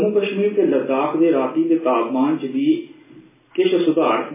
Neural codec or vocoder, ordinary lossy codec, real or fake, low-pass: none; MP3, 16 kbps; real; 3.6 kHz